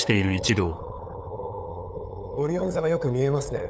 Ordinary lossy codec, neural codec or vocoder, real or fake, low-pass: none; codec, 16 kHz, 8 kbps, FunCodec, trained on LibriTTS, 25 frames a second; fake; none